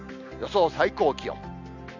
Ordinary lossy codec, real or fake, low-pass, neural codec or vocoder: none; real; 7.2 kHz; none